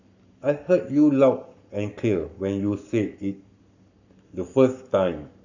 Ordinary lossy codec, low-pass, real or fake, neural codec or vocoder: none; 7.2 kHz; fake; codec, 44.1 kHz, 7.8 kbps, Pupu-Codec